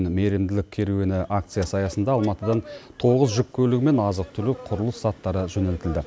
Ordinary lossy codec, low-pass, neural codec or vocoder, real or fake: none; none; none; real